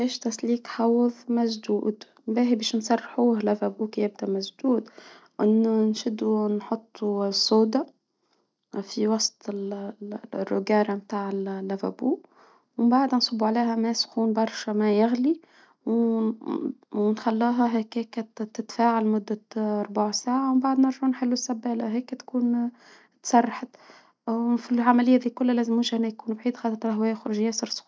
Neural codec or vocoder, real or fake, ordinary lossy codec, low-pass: none; real; none; none